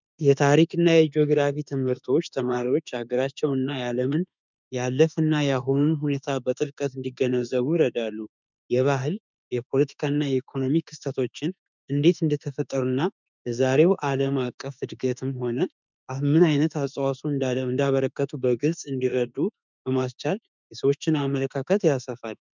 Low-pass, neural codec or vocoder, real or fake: 7.2 kHz; autoencoder, 48 kHz, 32 numbers a frame, DAC-VAE, trained on Japanese speech; fake